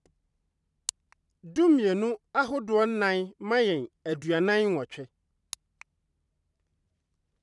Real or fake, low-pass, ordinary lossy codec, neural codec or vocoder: fake; 10.8 kHz; none; vocoder, 44.1 kHz, 128 mel bands every 512 samples, BigVGAN v2